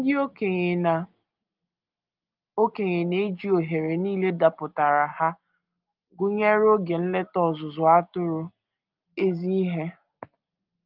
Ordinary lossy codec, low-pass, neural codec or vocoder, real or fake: Opus, 24 kbps; 5.4 kHz; none; real